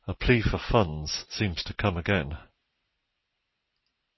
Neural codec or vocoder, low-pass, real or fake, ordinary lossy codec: none; 7.2 kHz; real; MP3, 24 kbps